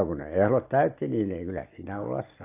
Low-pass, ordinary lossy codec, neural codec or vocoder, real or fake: 3.6 kHz; AAC, 24 kbps; none; real